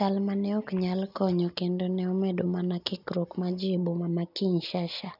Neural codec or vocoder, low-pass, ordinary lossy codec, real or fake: none; 5.4 kHz; none; real